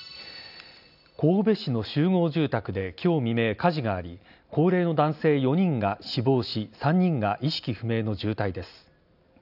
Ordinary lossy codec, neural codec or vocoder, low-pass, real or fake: none; none; 5.4 kHz; real